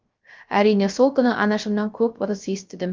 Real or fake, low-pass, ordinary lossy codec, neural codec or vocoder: fake; 7.2 kHz; Opus, 32 kbps; codec, 16 kHz, 0.3 kbps, FocalCodec